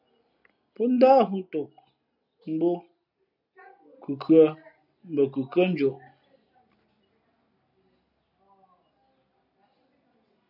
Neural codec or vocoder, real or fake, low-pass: none; real; 5.4 kHz